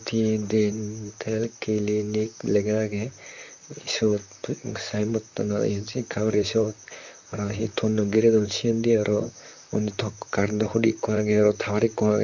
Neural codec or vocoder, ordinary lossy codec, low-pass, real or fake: vocoder, 44.1 kHz, 128 mel bands, Pupu-Vocoder; MP3, 64 kbps; 7.2 kHz; fake